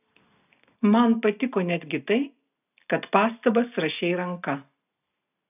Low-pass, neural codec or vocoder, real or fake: 3.6 kHz; none; real